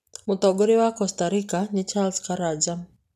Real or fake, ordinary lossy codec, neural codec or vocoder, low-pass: real; none; none; 14.4 kHz